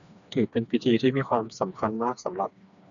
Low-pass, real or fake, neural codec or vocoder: 7.2 kHz; fake; codec, 16 kHz, 2 kbps, FreqCodec, smaller model